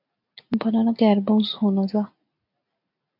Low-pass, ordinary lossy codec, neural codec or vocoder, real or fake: 5.4 kHz; MP3, 48 kbps; none; real